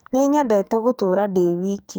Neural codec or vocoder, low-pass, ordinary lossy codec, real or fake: codec, 44.1 kHz, 2.6 kbps, SNAC; none; none; fake